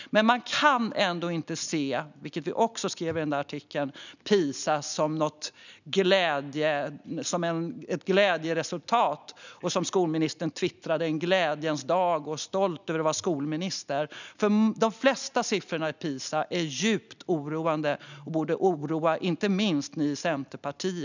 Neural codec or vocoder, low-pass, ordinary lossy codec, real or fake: none; 7.2 kHz; none; real